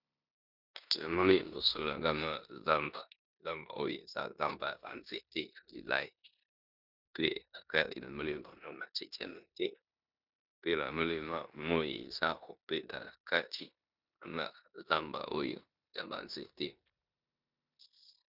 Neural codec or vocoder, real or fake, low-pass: codec, 16 kHz in and 24 kHz out, 0.9 kbps, LongCat-Audio-Codec, four codebook decoder; fake; 5.4 kHz